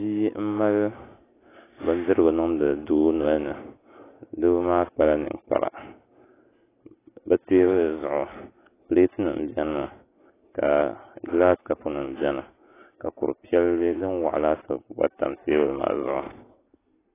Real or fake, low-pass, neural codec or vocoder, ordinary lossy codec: real; 3.6 kHz; none; AAC, 16 kbps